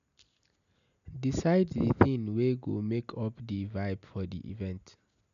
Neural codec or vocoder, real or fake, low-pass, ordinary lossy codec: none; real; 7.2 kHz; MP3, 96 kbps